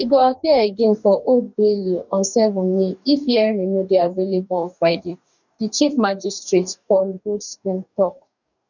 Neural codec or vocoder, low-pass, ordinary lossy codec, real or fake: codec, 44.1 kHz, 2.6 kbps, DAC; 7.2 kHz; none; fake